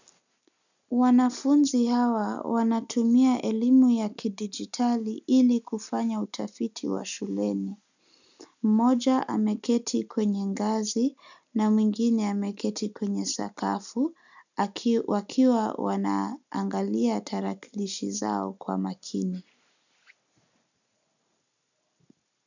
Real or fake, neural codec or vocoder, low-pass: real; none; 7.2 kHz